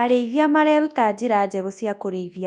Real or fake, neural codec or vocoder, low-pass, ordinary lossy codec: fake; codec, 24 kHz, 0.9 kbps, WavTokenizer, large speech release; 10.8 kHz; none